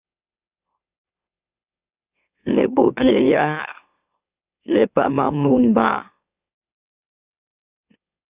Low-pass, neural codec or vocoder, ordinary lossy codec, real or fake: 3.6 kHz; autoencoder, 44.1 kHz, a latent of 192 numbers a frame, MeloTTS; Opus, 64 kbps; fake